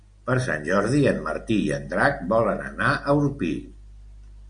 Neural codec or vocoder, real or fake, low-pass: none; real; 9.9 kHz